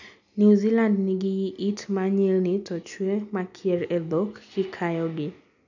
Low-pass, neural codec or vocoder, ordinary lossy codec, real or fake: 7.2 kHz; none; none; real